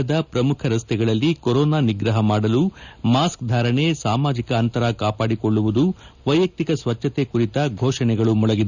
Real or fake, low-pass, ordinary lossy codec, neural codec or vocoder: real; 7.2 kHz; none; none